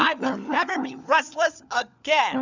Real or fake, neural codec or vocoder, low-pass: fake; codec, 16 kHz, 4 kbps, FunCodec, trained on LibriTTS, 50 frames a second; 7.2 kHz